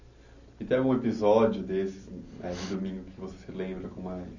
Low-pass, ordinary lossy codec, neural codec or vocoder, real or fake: 7.2 kHz; none; none; real